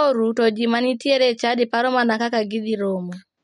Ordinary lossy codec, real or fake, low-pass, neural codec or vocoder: MP3, 48 kbps; real; 19.8 kHz; none